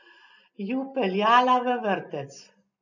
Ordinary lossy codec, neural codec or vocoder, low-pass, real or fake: none; none; 7.2 kHz; real